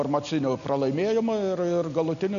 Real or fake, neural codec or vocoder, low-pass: real; none; 7.2 kHz